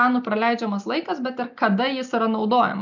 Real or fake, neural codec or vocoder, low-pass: real; none; 7.2 kHz